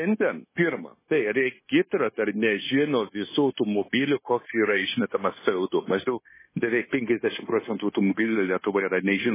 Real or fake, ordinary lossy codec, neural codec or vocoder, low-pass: fake; MP3, 16 kbps; codec, 16 kHz, 0.9 kbps, LongCat-Audio-Codec; 3.6 kHz